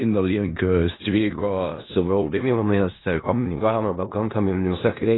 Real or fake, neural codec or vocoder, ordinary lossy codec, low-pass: fake; codec, 16 kHz in and 24 kHz out, 0.4 kbps, LongCat-Audio-Codec, four codebook decoder; AAC, 16 kbps; 7.2 kHz